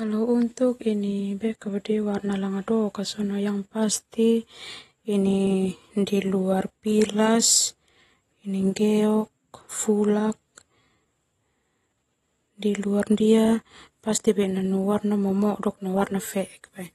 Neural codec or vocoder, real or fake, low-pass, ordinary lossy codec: none; real; 19.8 kHz; AAC, 32 kbps